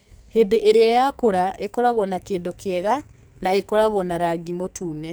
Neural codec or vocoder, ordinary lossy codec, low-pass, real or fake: codec, 44.1 kHz, 2.6 kbps, SNAC; none; none; fake